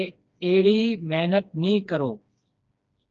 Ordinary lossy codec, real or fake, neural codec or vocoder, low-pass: Opus, 24 kbps; fake; codec, 16 kHz, 2 kbps, FreqCodec, smaller model; 7.2 kHz